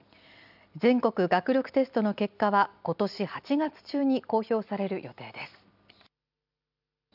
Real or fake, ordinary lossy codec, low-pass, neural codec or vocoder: real; none; 5.4 kHz; none